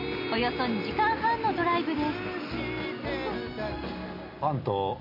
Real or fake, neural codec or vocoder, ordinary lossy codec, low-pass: real; none; MP3, 32 kbps; 5.4 kHz